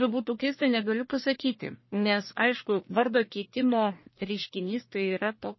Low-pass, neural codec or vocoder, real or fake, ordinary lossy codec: 7.2 kHz; codec, 44.1 kHz, 1.7 kbps, Pupu-Codec; fake; MP3, 24 kbps